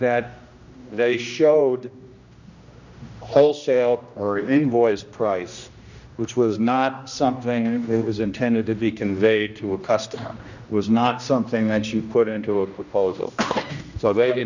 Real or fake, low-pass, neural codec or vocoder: fake; 7.2 kHz; codec, 16 kHz, 1 kbps, X-Codec, HuBERT features, trained on general audio